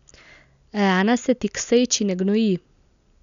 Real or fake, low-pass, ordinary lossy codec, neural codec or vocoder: real; 7.2 kHz; none; none